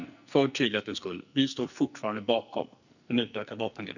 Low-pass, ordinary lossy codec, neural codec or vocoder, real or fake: 7.2 kHz; none; codec, 44.1 kHz, 2.6 kbps, SNAC; fake